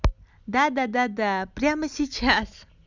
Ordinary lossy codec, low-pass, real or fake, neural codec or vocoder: Opus, 64 kbps; 7.2 kHz; real; none